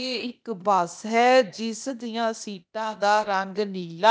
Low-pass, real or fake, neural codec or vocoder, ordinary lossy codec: none; fake; codec, 16 kHz, 0.8 kbps, ZipCodec; none